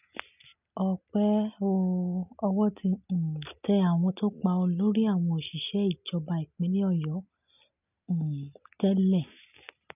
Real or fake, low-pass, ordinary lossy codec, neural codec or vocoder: real; 3.6 kHz; none; none